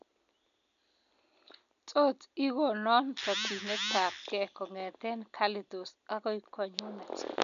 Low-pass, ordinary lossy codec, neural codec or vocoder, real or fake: 7.2 kHz; none; none; real